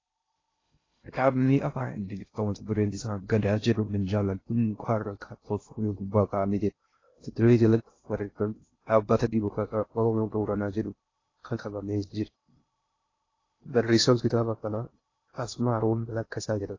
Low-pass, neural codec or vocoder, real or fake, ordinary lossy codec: 7.2 kHz; codec, 16 kHz in and 24 kHz out, 0.6 kbps, FocalCodec, streaming, 2048 codes; fake; AAC, 32 kbps